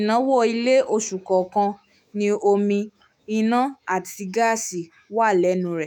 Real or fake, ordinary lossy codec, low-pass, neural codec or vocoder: fake; none; none; autoencoder, 48 kHz, 128 numbers a frame, DAC-VAE, trained on Japanese speech